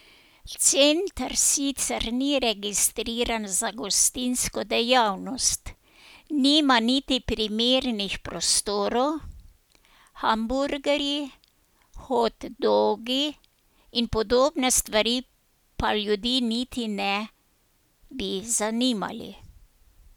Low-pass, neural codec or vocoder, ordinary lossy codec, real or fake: none; none; none; real